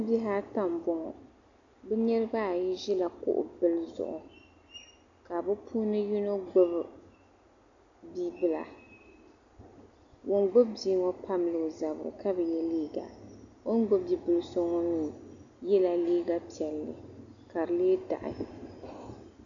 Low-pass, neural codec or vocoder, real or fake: 7.2 kHz; none; real